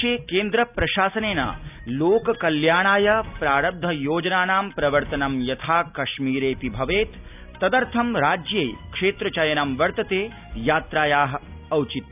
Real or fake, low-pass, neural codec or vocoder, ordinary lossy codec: real; 3.6 kHz; none; none